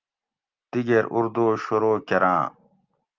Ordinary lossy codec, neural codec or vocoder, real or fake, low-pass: Opus, 24 kbps; none; real; 7.2 kHz